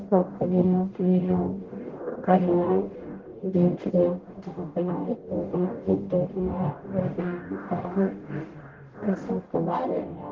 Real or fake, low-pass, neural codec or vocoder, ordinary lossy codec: fake; 7.2 kHz; codec, 44.1 kHz, 0.9 kbps, DAC; Opus, 16 kbps